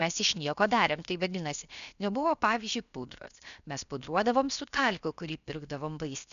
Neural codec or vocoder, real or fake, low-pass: codec, 16 kHz, 0.7 kbps, FocalCodec; fake; 7.2 kHz